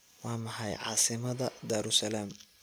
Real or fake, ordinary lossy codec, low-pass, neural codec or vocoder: real; none; none; none